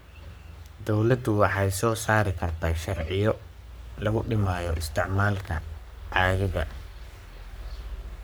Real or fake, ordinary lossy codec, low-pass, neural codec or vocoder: fake; none; none; codec, 44.1 kHz, 3.4 kbps, Pupu-Codec